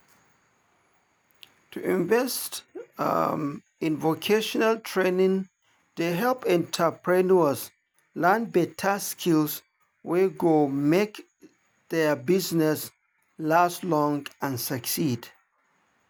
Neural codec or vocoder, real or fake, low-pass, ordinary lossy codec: none; real; none; none